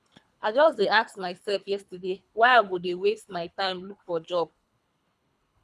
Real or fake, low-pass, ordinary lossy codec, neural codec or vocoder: fake; none; none; codec, 24 kHz, 3 kbps, HILCodec